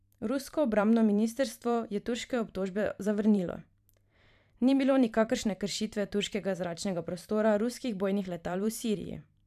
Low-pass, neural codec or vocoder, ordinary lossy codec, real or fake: 14.4 kHz; vocoder, 44.1 kHz, 128 mel bands every 512 samples, BigVGAN v2; none; fake